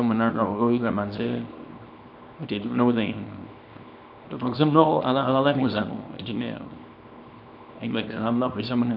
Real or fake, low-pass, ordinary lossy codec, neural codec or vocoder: fake; 5.4 kHz; none; codec, 24 kHz, 0.9 kbps, WavTokenizer, small release